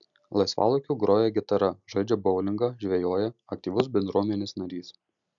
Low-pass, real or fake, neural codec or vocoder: 7.2 kHz; real; none